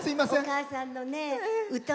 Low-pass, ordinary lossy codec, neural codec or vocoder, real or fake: none; none; none; real